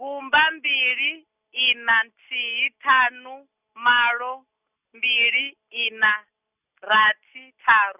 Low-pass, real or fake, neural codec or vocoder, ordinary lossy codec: 3.6 kHz; real; none; none